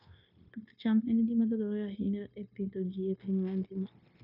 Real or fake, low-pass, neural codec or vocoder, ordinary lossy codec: fake; 5.4 kHz; codec, 16 kHz, 0.9 kbps, LongCat-Audio-Codec; MP3, 48 kbps